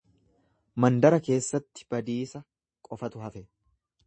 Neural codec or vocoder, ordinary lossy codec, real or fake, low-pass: none; MP3, 32 kbps; real; 9.9 kHz